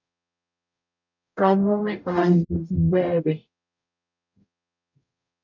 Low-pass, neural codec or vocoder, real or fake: 7.2 kHz; codec, 44.1 kHz, 0.9 kbps, DAC; fake